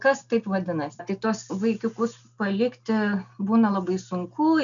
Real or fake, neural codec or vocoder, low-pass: real; none; 7.2 kHz